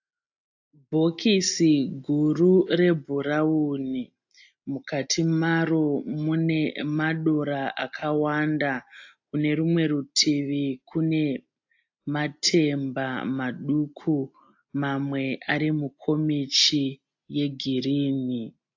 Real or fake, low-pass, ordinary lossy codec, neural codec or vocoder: real; 7.2 kHz; AAC, 48 kbps; none